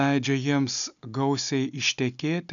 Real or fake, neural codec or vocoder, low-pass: real; none; 7.2 kHz